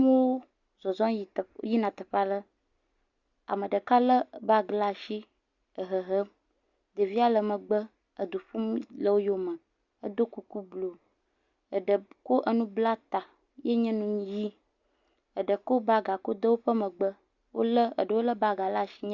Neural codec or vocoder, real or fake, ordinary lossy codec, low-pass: none; real; Opus, 64 kbps; 7.2 kHz